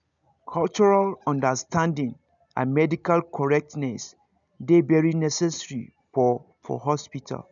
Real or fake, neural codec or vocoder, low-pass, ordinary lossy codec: real; none; 7.2 kHz; none